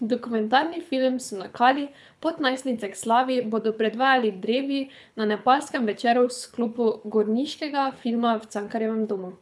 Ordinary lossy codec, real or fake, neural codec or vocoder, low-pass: none; fake; codec, 24 kHz, 6 kbps, HILCodec; none